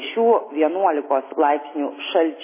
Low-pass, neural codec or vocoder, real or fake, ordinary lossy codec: 3.6 kHz; none; real; MP3, 16 kbps